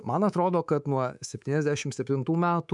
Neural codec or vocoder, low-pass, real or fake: codec, 24 kHz, 3.1 kbps, DualCodec; 10.8 kHz; fake